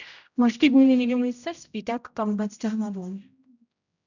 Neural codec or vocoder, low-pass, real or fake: codec, 16 kHz, 0.5 kbps, X-Codec, HuBERT features, trained on general audio; 7.2 kHz; fake